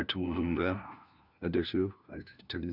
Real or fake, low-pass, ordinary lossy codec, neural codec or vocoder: fake; 5.4 kHz; none; codec, 16 kHz, 1 kbps, FunCodec, trained on LibriTTS, 50 frames a second